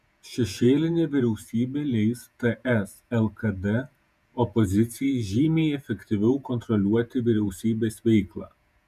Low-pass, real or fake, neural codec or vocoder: 14.4 kHz; fake; vocoder, 48 kHz, 128 mel bands, Vocos